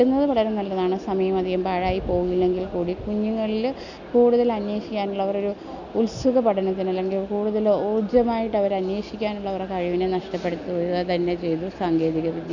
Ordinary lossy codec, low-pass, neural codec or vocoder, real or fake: none; 7.2 kHz; none; real